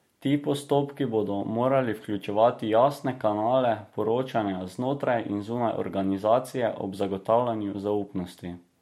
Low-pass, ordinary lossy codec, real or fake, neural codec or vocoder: 19.8 kHz; MP3, 64 kbps; real; none